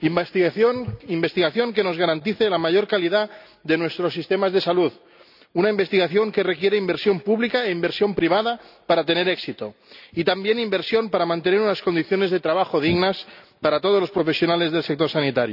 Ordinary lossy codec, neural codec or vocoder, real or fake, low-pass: none; none; real; 5.4 kHz